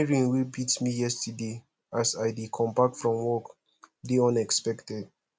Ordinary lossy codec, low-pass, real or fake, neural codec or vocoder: none; none; real; none